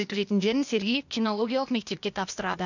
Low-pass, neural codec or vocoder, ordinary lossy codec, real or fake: 7.2 kHz; codec, 16 kHz, 0.8 kbps, ZipCodec; none; fake